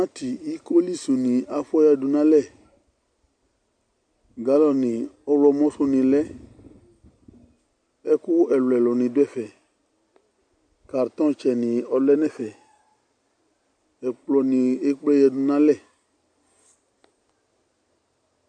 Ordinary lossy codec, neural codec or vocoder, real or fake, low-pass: MP3, 64 kbps; none; real; 9.9 kHz